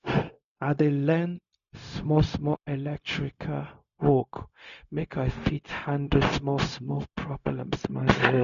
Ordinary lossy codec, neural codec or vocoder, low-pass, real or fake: none; codec, 16 kHz, 0.4 kbps, LongCat-Audio-Codec; 7.2 kHz; fake